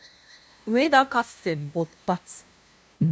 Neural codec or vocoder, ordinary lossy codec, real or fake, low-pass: codec, 16 kHz, 0.5 kbps, FunCodec, trained on LibriTTS, 25 frames a second; none; fake; none